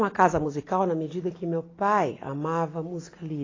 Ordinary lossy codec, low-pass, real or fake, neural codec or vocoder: AAC, 32 kbps; 7.2 kHz; real; none